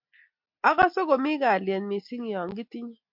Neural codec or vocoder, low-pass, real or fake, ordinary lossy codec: none; 5.4 kHz; real; MP3, 48 kbps